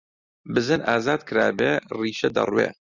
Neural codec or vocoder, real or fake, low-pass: none; real; 7.2 kHz